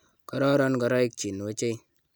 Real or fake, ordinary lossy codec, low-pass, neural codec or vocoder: real; none; none; none